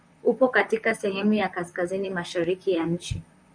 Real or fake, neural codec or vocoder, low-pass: fake; vocoder, 44.1 kHz, 128 mel bands, Pupu-Vocoder; 9.9 kHz